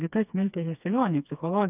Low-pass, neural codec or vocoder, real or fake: 3.6 kHz; codec, 16 kHz, 2 kbps, FreqCodec, smaller model; fake